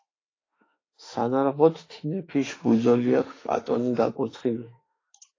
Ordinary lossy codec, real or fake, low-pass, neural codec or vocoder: AAC, 32 kbps; fake; 7.2 kHz; autoencoder, 48 kHz, 32 numbers a frame, DAC-VAE, trained on Japanese speech